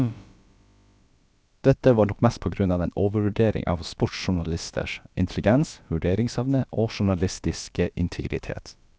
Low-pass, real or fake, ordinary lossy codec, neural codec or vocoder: none; fake; none; codec, 16 kHz, about 1 kbps, DyCAST, with the encoder's durations